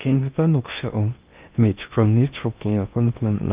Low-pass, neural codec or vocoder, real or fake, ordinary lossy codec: 3.6 kHz; codec, 16 kHz in and 24 kHz out, 0.6 kbps, FocalCodec, streaming, 2048 codes; fake; Opus, 32 kbps